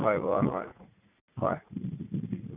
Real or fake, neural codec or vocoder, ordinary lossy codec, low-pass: fake; vocoder, 44.1 kHz, 80 mel bands, Vocos; none; 3.6 kHz